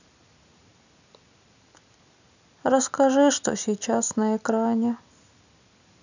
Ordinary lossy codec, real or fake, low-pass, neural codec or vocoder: none; real; 7.2 kHz; none